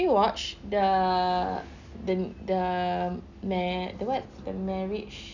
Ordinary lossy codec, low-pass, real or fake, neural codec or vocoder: none; 7.2 kHz; real; none